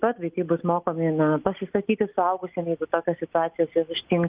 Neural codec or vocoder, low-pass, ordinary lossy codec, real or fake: none; 3.6 kHz; Opus, 24 kbps; real